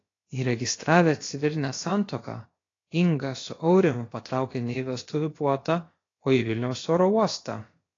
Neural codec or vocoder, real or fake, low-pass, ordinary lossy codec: codec, 16 kHz, about 1 kbps, DyCAST, with the encoder's durations; fake; 7.2 kHz; AAC, 32 kbps